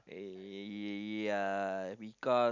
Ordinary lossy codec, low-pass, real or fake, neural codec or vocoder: none; 7.2 kHz; real; none